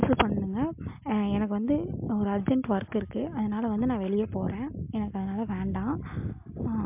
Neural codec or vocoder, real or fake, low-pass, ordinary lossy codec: none; real; 3.6 kHz; MP3, 32 kbps